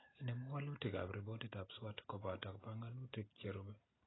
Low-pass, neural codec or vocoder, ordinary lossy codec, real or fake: 7.2 kHz; none; AAC, 16 kbps; real